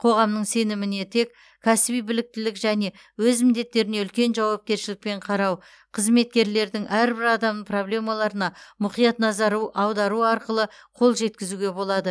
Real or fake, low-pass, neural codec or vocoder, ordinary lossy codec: real; none; none; none